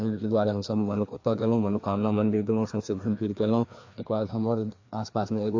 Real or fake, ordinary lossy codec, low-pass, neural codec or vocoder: fake; AAC, 48 kbps; 7.2 kHz; codec, 16 kHz, 2 kbps, FreqCodec, larger model